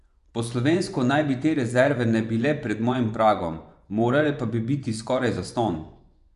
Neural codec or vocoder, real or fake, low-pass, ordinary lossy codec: vocoder, 24 kHz, 100 mel bands, Vocos; fake; 10.8 kHz; none